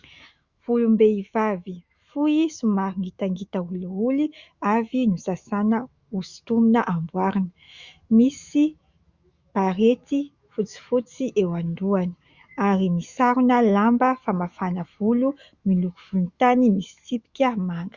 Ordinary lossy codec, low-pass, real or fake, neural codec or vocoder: Opus, 64 kbps; 7.2 kHz; fake; autoencoder, 48 kHz, 128 numbers a frame, DAC-VAE, trained on Japanese speech